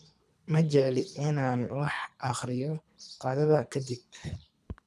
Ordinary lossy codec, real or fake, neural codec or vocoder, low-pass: none; fake; codec, 24 kHz, 3 kbps, HILCodec; none